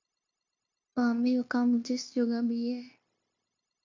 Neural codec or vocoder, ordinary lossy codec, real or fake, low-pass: codec, 16 kHz, 0.9 kbps, LongCat-Audio-Codec; MP3, 48 kbps; fake; 7.2 kHz